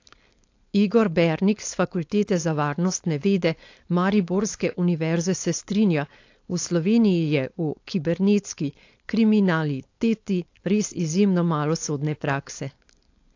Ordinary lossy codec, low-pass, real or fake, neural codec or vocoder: AAC, 48 kbps; 7.2 kHz; fake; codec, 16 kHz, 4.8 kbps, FACodec